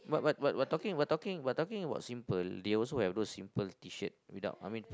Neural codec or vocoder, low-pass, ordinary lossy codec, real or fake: none; none; none; real